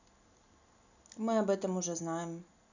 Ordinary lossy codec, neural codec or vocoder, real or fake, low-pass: none; none; real; 7.2 kHz